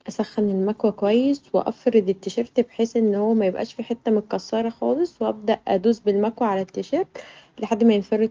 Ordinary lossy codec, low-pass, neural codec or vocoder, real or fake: Opus, 24 kbps; 7.2 kHz; none; real